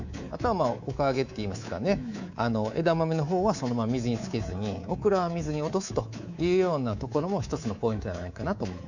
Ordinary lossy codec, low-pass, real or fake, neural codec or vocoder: none; 7.2 kHz; fake; codec, 24 kHz, 3.1 kbps, DualCodec